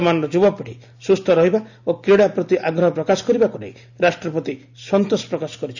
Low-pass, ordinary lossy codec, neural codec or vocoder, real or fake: 7.2 kHz; none; none; real